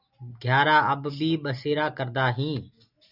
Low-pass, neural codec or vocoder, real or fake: 5.4 kHz; none; real